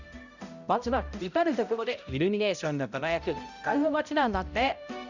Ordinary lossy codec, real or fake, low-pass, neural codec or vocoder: none; fake; 7.2 kHz; codec, 16 kHz, 0.5 kbps, X-Codec, HuBERT features, trained on balanced general audio